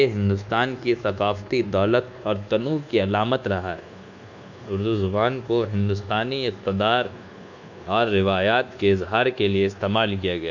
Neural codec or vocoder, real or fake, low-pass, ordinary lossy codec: codec, 24 kHz, 1.2 kbps, DualCodec; fake; 7.2 kHz; none